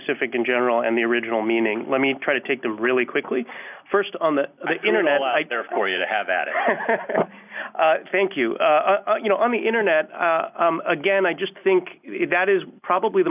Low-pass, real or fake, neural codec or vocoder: 3.6 kHz; real; none